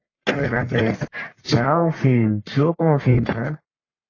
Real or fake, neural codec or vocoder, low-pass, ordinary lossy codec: fake; codec, 44.1 kHz, 1.7 kbps, Pupu-Codec; 7.2 kHz; AAC, 32 kbps